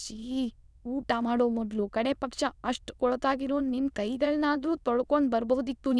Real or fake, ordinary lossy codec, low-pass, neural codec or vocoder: fake; none; none; autoencoder, 22.05 kHz, a latent of 192 numbers a frame, VITS, trained on many speakers